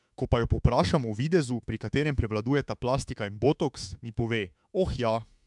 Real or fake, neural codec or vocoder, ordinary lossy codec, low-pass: fake; autoencoder, 48 kHz, 32 numbers a frame, DAC-VAE, trained on Japanese speech; MP3, 96 kbps; 10.8 kHz